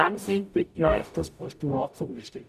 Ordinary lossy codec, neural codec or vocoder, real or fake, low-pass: none; codec, 44.1 kHz, 0.9 kbps, DAC; fake; 14.4 kHz